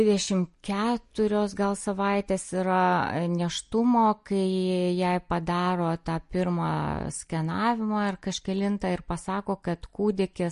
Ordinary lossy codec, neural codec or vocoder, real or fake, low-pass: MP3, 48 kbps; none; real; 10.8 kHz